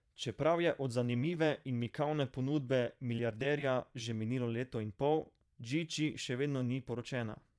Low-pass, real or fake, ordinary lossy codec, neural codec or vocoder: none; fake; none; vocoder, 22.05 kHz, 80 mel bands, Vocos